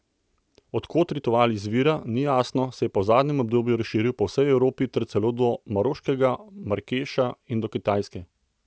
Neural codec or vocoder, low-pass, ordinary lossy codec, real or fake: none; none; none; real